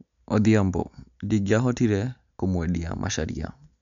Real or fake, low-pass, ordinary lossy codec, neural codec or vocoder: real; 7.2 kHz; none; none